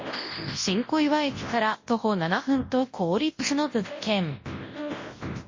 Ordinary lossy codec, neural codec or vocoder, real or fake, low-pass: MP3, 32 kbps; codec, 24 kHz, 0.9 kbps, WavTokenizer, large speech release; fake; 7.2 kHz